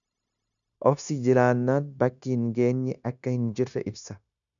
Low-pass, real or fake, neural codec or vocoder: 7.2 kHz; fake; codec, 16 kHz, 0.9 kbps, LongCat-Audio-Codec